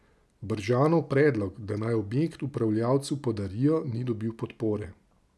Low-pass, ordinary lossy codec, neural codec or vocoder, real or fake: none; none; none; real